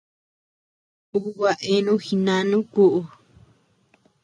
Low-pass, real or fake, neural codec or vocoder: 9.9 kHz; real; none